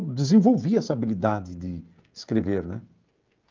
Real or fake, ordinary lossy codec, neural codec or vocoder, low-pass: fake; Opus, 24 kbps; codec, 16 kHz, 16 kbps, FreqCodec, smaller model; 7.2 kHz